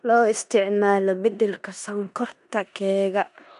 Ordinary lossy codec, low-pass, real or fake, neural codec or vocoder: none; 10.8 kHz; fake; codec, 16 kHz in and 24 kHz out, 0.9 kbps, LongCat-Audio-Codec, four codebook decoder